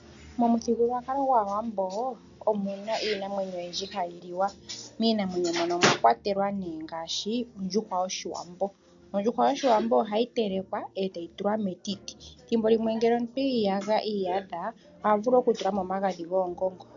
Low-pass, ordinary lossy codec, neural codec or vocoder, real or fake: 7.2 kHz; AAC, 64 kbps; none; real